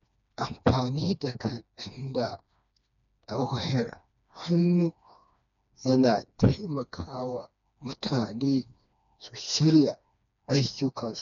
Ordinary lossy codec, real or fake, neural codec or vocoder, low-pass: none; fake; codec, 16 kHz, 2 kbps, FreqCodec, smaller model; 7.2 kHz